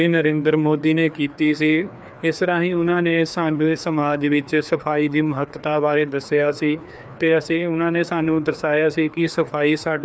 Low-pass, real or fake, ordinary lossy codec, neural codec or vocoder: none; fake; none; codec, 16 kHz, 2 kbps, FreqCodec, larger model